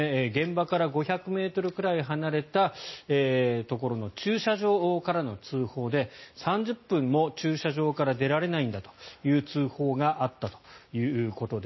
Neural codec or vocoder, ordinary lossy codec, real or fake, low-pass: none; MP3, 24 kbps; real; 7.2 kHz